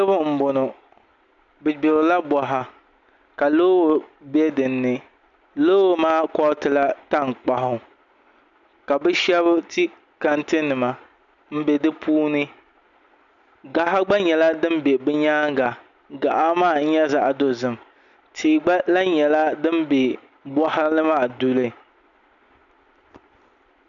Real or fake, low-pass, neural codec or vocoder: real; 7.2 kHz; none